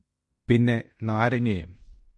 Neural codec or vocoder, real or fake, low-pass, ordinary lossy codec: codec, 16 kHz in and 24 kHz out, 0.9 kbps, LongCat-Audio-Codec, fine tuned four codebook decoder; fake; 10.8 kHz; MP3, 48 kbps